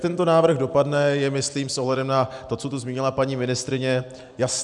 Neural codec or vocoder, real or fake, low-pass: none; real; 10.8 kHz